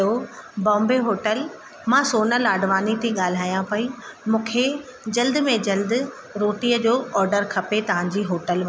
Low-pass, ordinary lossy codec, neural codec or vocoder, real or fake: none; none; none; real